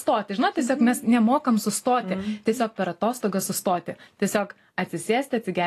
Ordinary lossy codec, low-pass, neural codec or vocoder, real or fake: AAC, 48 kbps; 14.4 kHz; none; real